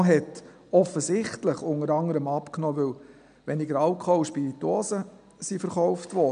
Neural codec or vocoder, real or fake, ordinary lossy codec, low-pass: none; real; none; 9.9 kHz